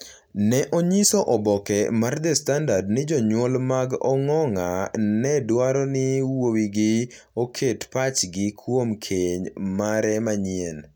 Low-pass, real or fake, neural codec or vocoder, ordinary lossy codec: 19.8 kHz; real; none; none